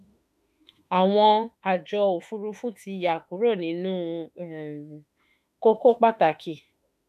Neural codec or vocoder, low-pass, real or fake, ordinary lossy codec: autoencoder, 48 kHz, 32 numbers a frame, DAC-VAE, trained on Japanese speech; 14.4 kHz; fake; none